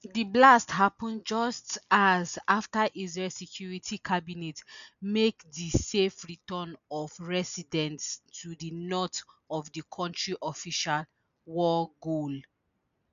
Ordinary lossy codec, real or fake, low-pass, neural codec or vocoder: none; real; 7.2 kHz; none